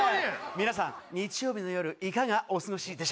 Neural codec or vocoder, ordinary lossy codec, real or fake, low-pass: none; none; real; none